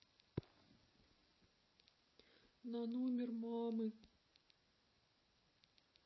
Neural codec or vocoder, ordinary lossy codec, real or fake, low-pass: none; MP3, 24 kbps; real; 7.2 kHz